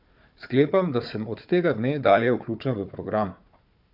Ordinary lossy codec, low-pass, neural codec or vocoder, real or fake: none; 5.4 kHz; codec, 16 kHz, 4 kbps, FunCodec, trained on Chinese and English, 50 frames a second; fake